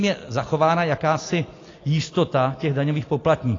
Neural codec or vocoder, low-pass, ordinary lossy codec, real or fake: none; 7.2 kHz; AAC, 32 kbps; real